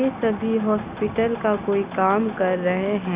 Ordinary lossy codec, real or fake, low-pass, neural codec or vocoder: Opus, 64 kbps; real; 3.6 kHz; none